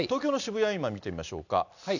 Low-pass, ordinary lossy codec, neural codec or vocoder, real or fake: 7.2 kHz; none; none; real